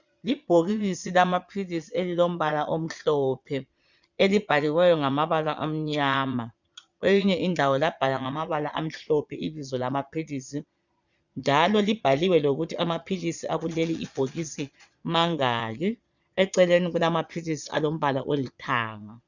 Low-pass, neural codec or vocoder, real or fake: 7.2 kHz; vocoder, 22.05 kHz, 80 mel bands, Vocos; fake